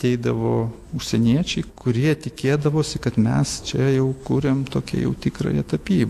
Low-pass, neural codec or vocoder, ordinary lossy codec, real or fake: 14.4 kHz; autoencoder, 48 kHz, 128 numbers a frame, DAC-VAE, trained on Japanese speech; AAC, 64 kbps; fake